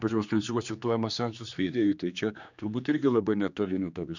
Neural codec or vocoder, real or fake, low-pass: codec, 16 kHz, 2 kbps, X-Codec, HuBERT features, trained on general audio; fake; 7.2 kHz